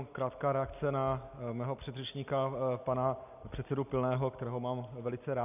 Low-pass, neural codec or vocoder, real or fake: 3.6 kHz; none; real